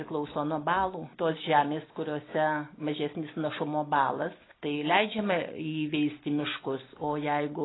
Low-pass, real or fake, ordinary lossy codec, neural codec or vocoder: 7.2 kHz; real; AAC, 16 kbps; none